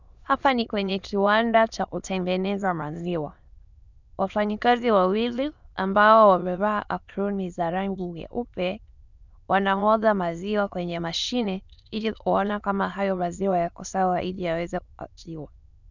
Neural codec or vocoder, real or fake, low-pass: autoencoder, 22.05 kHz, a latent of 192 numbers a frame, VITS, trained on many speakers; fake; 7.2 kHz